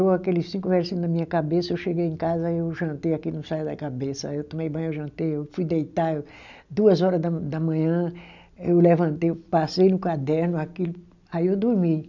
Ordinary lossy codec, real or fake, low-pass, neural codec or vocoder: none; real; 7.2 kHz; none